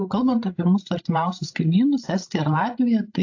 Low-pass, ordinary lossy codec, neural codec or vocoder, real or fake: 7.2 kHz; AAC, 48 kbps; codec, 16 kHz, 16 kbps, FreqCodec, larger model; fake